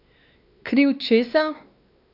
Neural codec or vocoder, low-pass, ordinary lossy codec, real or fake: codec, 16 kHz, 2 kbps, X-Codec, WavLM features, trained on Multilingual LibriSpeech; 5.4 kHz; none; fake